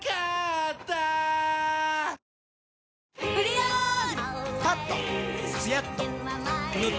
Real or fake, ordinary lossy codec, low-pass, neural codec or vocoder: real; none; none; none